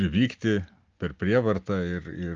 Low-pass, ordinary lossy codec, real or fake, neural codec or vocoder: 7.2 kHz; Opus, 24 kbps; real; none